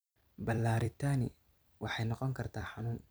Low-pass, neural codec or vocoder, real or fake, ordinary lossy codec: none; vocoder, 44.1 kHz, 128 mel bands every 256 samples, BigVGAN v2; fake; none